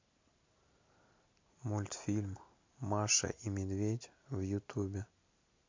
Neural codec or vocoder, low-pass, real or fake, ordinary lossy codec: none; 7.2 kHz; real; MP3, 48 kbps